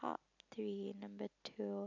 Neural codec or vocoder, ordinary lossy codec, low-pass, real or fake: none; none; 7.2 kHz; real